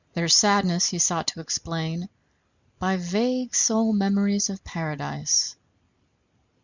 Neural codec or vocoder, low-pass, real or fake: none; 7.2 kHz; real